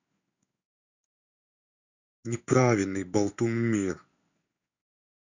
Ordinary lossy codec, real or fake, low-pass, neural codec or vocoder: none; fake; 7.2 kHz; codec, 16 kHz in and 24 kHz out, 1 kbps, XY-Tokenizer